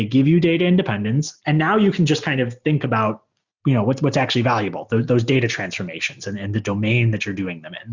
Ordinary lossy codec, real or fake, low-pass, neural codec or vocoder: Opus, 64 kbps; real; 7.2 kHz; none